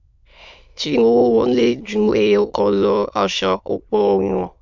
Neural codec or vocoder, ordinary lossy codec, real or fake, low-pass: autoencoder, 22.05 kHz, a latent of 192 numbers a frame, VITS, trained on many speakers; MP3, 64 kbps; fake; 7.2 kHz